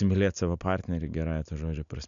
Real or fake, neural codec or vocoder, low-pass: real; none; 7.2 kHz